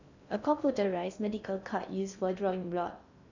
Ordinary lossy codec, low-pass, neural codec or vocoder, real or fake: none; 7.2 kHz; codec, 16 kHz in and 24 kHz out, 0.6 kbps, FocalCodec, streaming, 2048 codes; fake